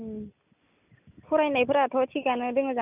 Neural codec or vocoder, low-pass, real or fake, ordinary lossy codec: none; 3.6 kHz; real; none